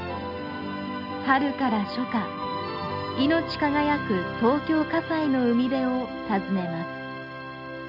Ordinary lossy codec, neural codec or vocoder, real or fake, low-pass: none; none; real; 5.4 kHz